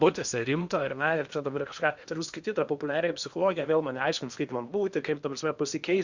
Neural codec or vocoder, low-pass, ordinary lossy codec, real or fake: codec, 16 kHz in and 24 kHz out, 0.8 kbps, FocalCodec, streaming, 65536 codes; 7.2 kHz; Opus, 64 kbps; fake